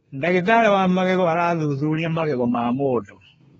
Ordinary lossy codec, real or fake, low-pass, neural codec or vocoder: AAC, 24 kbps; fake; 14.4 kHz; codec, 32 kHz, 1.9 kbps, SNAC